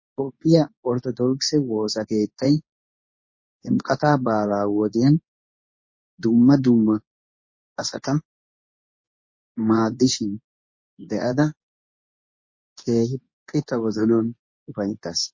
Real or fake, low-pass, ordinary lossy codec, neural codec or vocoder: fake; 7.2 kHz; MP3, 32 kbps; codec, 24 kHz, 0.9 kbps, WavTokenizer, medium speech release version 2